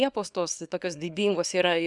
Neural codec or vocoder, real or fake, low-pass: codec, 24 kHz, 0.9 kbps, WavTokenizer, small release; fake; 10.8 kHz